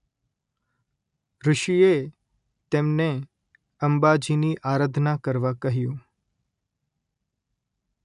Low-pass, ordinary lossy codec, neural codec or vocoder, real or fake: 10.8 kHz; none; none; real